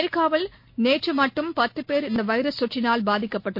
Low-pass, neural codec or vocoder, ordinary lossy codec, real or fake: 5.4 kHz; none; MP3, 32 kbps; real